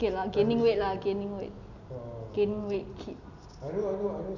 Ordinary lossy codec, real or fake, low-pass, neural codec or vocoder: none; real; 7.2 kHz; none